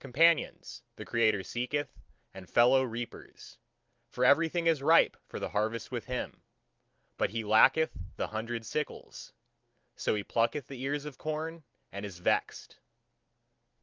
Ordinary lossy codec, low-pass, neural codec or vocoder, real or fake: Opus, 24 kbps; 7.2 kHz; none; real